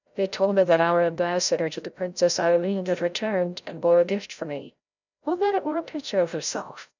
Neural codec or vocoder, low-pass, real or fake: codec, 16 kHz, 0.5 kbps, FreqCodec, larger model; 7.2 kHz; fake